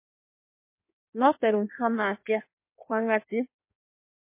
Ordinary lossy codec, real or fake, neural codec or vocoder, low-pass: MP3, 24 kbps; fake; codec, 16 kHz in and 24 kHz out, 1.1 kbps, FireRedTTS-2 codec; 3.6 kHz